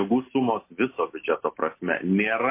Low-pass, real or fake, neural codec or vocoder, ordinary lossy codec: 3.6 kHz; real; none; MP3, 24 kbps